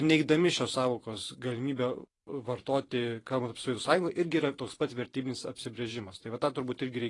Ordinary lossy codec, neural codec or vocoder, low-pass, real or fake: AAC, 32 kbps; vocoder, 44.1 kHz, 128 mel bands every 512 samples, BigVGAN v2; 10.8 kHz; fake